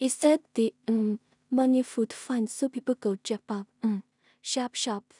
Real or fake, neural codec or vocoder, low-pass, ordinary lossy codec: fake; codec, 16 kHz in and 24 kHz out, 0.4 kbps, LongCat-Audio-Codec, two codebook decoder; 10.8 kHz; none